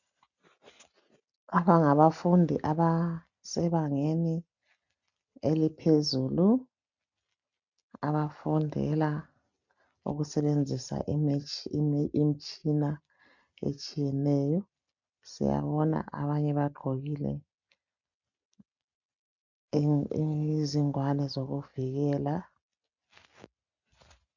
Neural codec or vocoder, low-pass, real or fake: none; 7.2 kHz; real